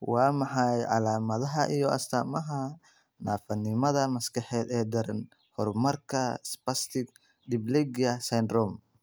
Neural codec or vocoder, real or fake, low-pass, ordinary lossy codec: none; real; none; none